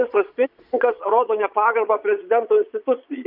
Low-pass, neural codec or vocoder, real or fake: 5.4 kHz; vocoder, 22.05 kHz, 80 mel bands, Vocos; fake